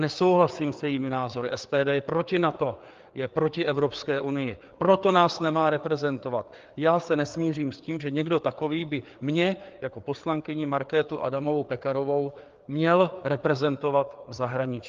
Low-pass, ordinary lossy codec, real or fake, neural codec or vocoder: 7.2 kHz; Opus, 32 kbps; fake; codec, 16 kHz, 4 kbps, FreqCodec, larger model